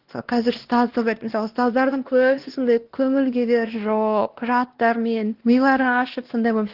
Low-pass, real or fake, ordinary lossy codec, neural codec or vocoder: 5.4 kHz; fake; Opus, 16 kbps; codec, 16 kHz, 1 kbps, X-Codec, WavLM features, trained on Multilingual LibriSpeech